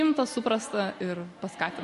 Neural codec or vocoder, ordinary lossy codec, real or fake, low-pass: none; MP3, 48 kbps; real; 14.4 kHz